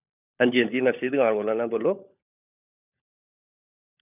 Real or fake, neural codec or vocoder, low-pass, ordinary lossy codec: fake; codec, 16 kHz, 16 kbps, FunCodec, trained on LibriTTS, 50 frames a second; 3.6 kHz; none